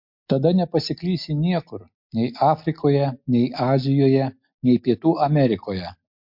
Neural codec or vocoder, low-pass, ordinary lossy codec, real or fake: none; 5.4 kHz; MP3, 48 kbps; real